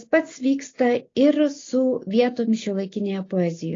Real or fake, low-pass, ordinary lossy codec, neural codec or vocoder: real; 7.2 kHz; AAC, 32 kbps; none